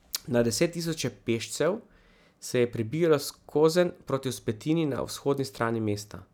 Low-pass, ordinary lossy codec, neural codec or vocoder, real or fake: 19.8 kHz; none; none; real